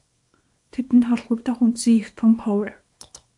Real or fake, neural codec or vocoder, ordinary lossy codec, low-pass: fake; codec, 24 kHz, 0.9 kbps, WavTokenizer, small release; AAC, 64 kbps; 10.8 kHz